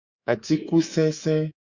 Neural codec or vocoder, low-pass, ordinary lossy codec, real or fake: codec, 24 kHz, 3.1 kbps, DualCodec; 7.2 kHz; Opus, 64 kbps; fake